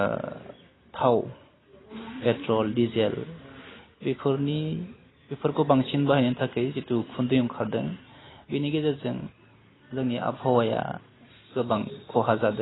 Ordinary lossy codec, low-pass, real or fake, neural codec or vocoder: AAC, 16 kbps; 7.2 kHz; real; none